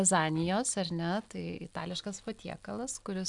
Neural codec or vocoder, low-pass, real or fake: none; 10.8 kHz; real